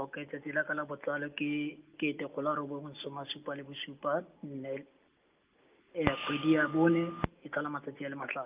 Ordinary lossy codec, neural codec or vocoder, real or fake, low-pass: Opus, 24 kbps; none; real; 3.6 kHz